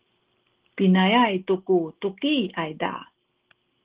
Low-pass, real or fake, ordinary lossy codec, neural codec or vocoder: 3.6 kHz; real; Opus, 16 kbps; none